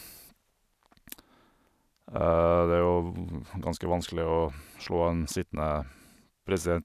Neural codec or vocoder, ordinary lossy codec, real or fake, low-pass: none; none; real; 14.4 kHz